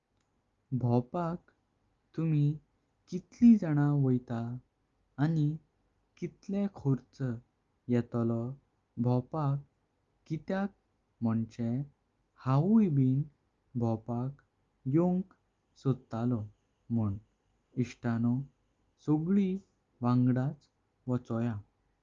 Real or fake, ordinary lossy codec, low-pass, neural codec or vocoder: real; Opus, 32 kbps; 7.2 kHz; none